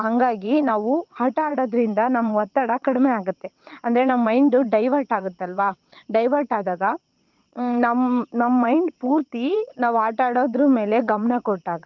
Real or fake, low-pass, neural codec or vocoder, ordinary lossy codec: fake; 7.2 kHz; vocoder, 22.05 kHz, 80 mel bands, WaveNeXt; Opus, 24 kbps